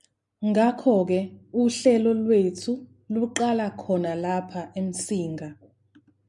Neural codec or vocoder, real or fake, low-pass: none; real; 10.8 kHz